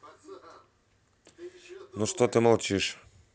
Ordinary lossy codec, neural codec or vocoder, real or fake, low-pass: none; none; real; none